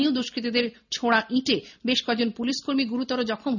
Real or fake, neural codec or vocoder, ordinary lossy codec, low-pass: real; none; none; 7.2 kHz